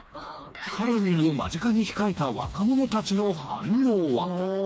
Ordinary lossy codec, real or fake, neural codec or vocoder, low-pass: none; fake; codec, 16 kHz, 2 kbps, FreqCodec, smaller model; none